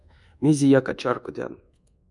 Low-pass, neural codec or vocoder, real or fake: 10.8 kHz; codec, 24 kHz, 1.2 kbps, DualCodec; fake